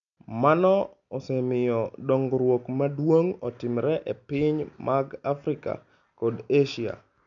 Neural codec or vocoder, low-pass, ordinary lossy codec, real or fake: none; 7.2 kHz; MP3, 96 kbps; real